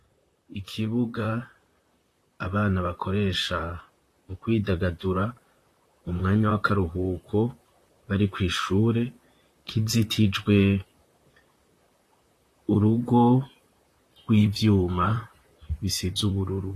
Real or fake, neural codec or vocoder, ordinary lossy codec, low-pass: fake; vocoder, 44.1 kHz, 128 mel bands, Pupu-Vocoder; AAC, 48 kbps; 14.4 kHz